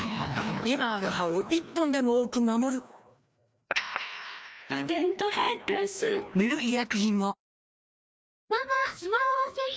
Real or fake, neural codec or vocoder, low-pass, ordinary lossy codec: fake; codec, 16 kHz, 1 kbps, FreqCodec, larger model; none; none